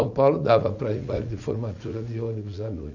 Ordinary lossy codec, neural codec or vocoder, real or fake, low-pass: none; vocoder, 44.1 kHz, 80 mel bands, Vocos; fake; 7.2 kHz